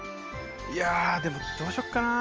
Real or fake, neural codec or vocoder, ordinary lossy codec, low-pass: real; none; Opus, 24 kbps; 7.2 kHz